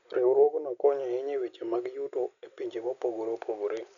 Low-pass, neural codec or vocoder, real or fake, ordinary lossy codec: 7.2 kHz; none; real; none